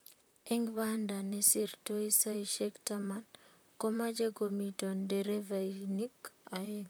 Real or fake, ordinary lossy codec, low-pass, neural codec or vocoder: fake; none; none; vocoder, 44.1 kHz, 128 mel bands, Pupu-Vocoder